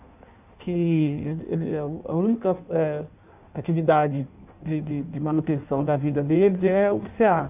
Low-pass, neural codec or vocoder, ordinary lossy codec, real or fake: 3.6 kHz; codec, 16 kHz in and 24 kHz out, 1.1 kbps, FireRedTTS-2 codec; none; fake